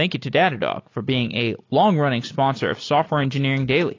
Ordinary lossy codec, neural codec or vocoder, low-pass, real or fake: AAC, 32 kbps; none; 7.2 kHz; real